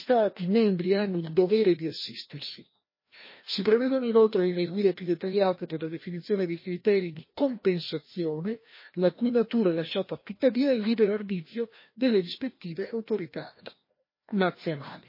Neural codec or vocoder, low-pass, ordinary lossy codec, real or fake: codec, 16 kHz, 1 kbps, FreqCodec, larger model; 5.4 kHz; MP3, 24 kbps; fake